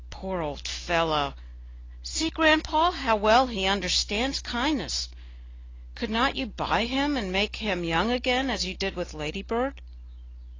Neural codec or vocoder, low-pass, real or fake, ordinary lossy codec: none; 7.2 kHz; real; AAC, 32 kbps